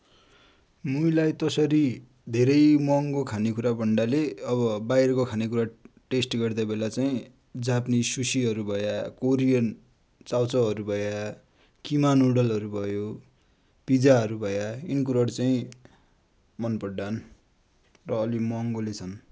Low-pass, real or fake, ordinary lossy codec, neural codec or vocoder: none; real; none; none